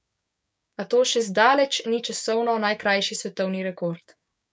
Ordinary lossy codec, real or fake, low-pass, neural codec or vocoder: none; fake; none; codec, 16 kHz, 6 kbps, DAC